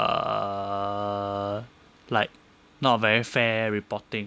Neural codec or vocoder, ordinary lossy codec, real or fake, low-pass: none; none; real; none